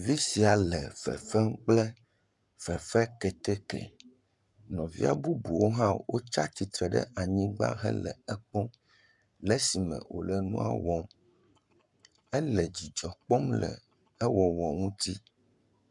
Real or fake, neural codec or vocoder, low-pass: fake; codec, 44.1 kHz, 7.8 kbps, Pupu-Codec; 10.8 kHz